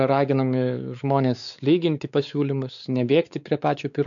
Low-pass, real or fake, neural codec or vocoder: 7.2 kHz; fake; codec, 16 kHz, 4 kbps, X-Codec, WavLM features, trained on Multilingual LibriSpeech